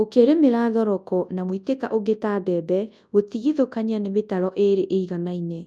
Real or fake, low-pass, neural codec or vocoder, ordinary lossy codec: fake; none; codec, 24 kHz, 0.9 kbps, WavTokenizer, large speech release; none